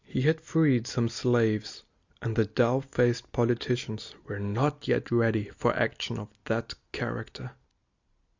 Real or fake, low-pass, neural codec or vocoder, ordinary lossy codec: real; 7.2 kHz; none; Opus, 64 kbps